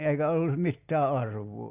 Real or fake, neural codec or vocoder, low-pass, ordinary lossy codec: real; none; 3.6 kHz; Opus, 64 kbps